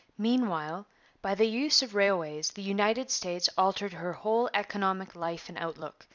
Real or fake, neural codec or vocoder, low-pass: fake; vocoder, 44.1 kHz, 128 mel bands every 256 samples, BigVGAN v2; 7.2 kHz